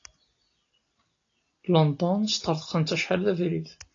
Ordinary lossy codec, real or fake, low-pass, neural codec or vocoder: AAC, 32 kbps; real; 7.2 kHz; none